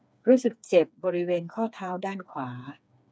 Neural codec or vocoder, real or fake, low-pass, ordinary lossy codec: codec, 16 kHz, 4 kbps, FreqCodec, smaller model; fake; none; none